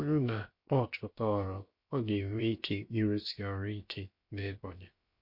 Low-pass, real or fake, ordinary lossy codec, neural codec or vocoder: 5.4 kHz; fake; MP3, 32 kbps; codec, 16 kHz, 0.7 kbps, FocalCodec